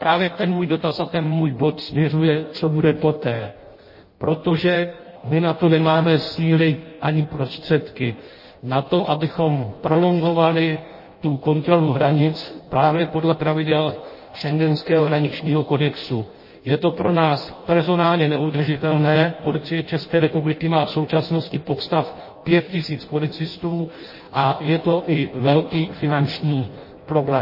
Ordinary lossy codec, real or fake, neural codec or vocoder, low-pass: MP3, 24 kbps; fake; codec, 16 kHz in and 24 kHz out, 0.6 kbps, FireRedTTS-2 codec; 5.4 kHz